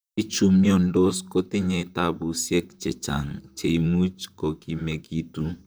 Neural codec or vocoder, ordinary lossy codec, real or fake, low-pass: vocoder, 44.1 kHz, 128 mel bands, Pupu-Vocoder; none; fake; none